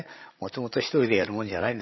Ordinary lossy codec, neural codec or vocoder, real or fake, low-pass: MP3, 24 kbps; codec, 16 kHz, 16 kbps, FreqCodec, larger model; fake; 7.2 kHz